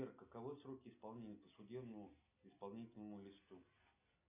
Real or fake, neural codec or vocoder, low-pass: real; none; 3.6 kHz